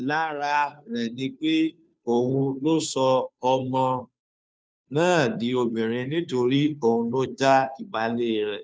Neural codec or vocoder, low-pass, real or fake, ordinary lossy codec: codec, 16 kHz, 2 kbps, FunCodec, trained on Chinese and English, 25 frames a second; none; fake; none